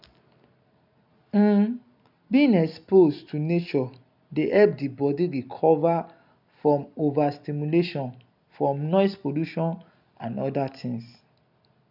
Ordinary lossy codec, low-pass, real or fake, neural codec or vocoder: none; 5.4 kHz; real; none